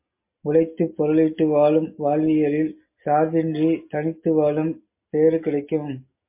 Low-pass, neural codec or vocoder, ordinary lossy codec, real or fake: 3.6 kHz; none; MP3, 24 kbps; real